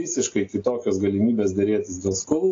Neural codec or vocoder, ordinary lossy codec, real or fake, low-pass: none; AAC, 32 kbps; real; 7.2 kHz